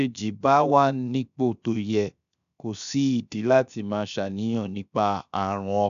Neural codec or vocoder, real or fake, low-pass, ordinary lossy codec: codec, 16 kHz, 0.7 kbps, FocalCodec; fake; 7.2 kHz; AAC, 96 kbps